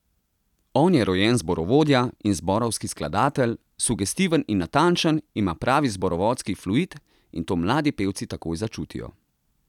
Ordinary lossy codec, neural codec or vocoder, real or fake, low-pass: none; none; real; 19.8 kHz